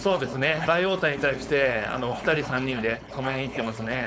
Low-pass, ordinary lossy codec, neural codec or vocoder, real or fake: none; none; codec, 16 kHz, 4.8 kbps, FACodec; fake